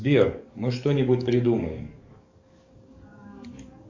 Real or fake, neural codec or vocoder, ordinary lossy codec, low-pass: fake; codec, 44.1 kHz, 7.8 kbps, DAC; MP3, 64 kbps; 7.2 kHz